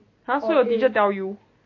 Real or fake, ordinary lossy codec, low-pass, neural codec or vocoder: real; AAC, 48 kbps; 7.2 kHz; none